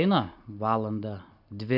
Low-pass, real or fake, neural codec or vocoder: 5.4 kHz; real; none